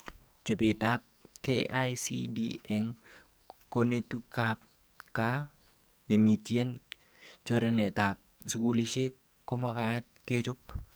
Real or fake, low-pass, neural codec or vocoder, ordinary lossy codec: fake; none; codec, 44.1 kHz, 2.6 kbps, SNAC; none